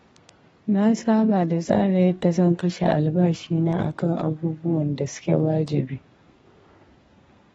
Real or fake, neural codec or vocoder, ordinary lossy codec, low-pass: fake; codec, 32 kHz, 1.9 kbps, SNAC; AAC, 24 kbps; 14.4 kHz